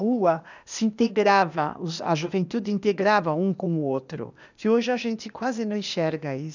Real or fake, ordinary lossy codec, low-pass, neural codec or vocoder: fake; none; 7.2 kHz; codec, 16 kHz, 0.8 kbps, ZipCodec